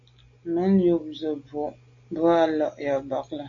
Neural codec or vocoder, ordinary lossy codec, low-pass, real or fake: none; AAC, 48 kbps; 7.2 kHz; real